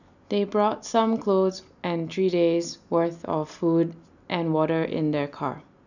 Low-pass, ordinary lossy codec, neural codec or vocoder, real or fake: 7.2 kHz; none; none; real